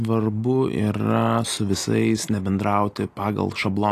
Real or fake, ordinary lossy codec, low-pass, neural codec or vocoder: real; AAC, 48 kbps; 14.4 kHz; none